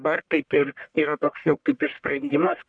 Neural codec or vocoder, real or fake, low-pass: codec, 44.1 kHz, 1.7 kbps, Pupu-Codec; fake; 9.9 kHz